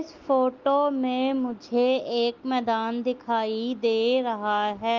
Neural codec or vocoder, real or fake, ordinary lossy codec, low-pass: none; real; Opus, 32 kbps; 7.2 kHz